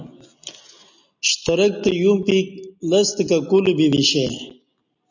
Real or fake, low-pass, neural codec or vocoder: real; 7.2 kHz; none